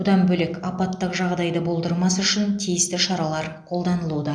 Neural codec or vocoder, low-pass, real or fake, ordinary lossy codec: none; 9.9 kHz; real; none